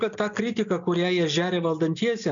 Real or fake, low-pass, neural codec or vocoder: real; 7.2 kHz; none